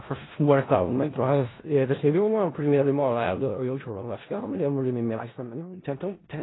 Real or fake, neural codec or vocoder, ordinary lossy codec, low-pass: fake; codec, 16 kHz in and 24 kHz out, 0.4 kbps, LongCat-Audio-Codec, four codebook decoder; AAC, 16 kbps; 7.2 kHz